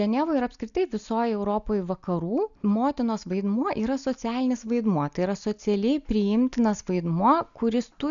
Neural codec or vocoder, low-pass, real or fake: none; 7.2 kHz; real